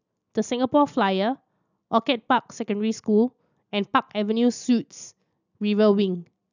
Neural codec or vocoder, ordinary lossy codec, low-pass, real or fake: none; none; 7.2 kHz; real